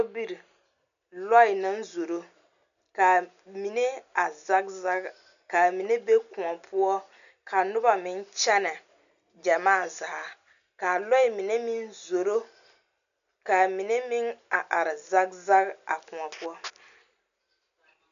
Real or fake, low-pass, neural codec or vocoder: real; 7.2 kHz; none